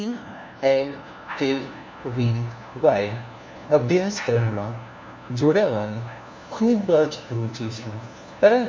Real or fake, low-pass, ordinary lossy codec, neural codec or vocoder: fake; none; none; codec, 16 kHz, 1 kbps, FunCodec, trained on LibriTTS, 50 frames a second